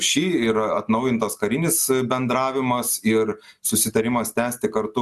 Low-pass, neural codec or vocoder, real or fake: 14.4 kHz; none; real